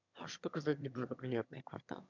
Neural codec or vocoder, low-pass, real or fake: autoencoder, 22.05 kHz, a latent of 192 numbers a frame, VITS, trained on one speaker; 7.2 kHz; fake